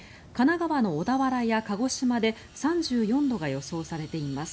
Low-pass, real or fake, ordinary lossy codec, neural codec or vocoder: none; real; none; none